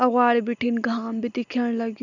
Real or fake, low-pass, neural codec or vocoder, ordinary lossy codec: real; 7.2 kHz; none; none